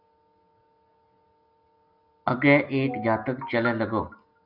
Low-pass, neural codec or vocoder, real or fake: 5.4 kHz; none; real